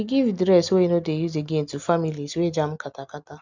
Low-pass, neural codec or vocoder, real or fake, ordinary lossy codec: 7.2 kHz; none; real; none